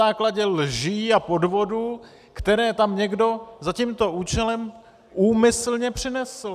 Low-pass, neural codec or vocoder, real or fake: 14.4 kHz; none; real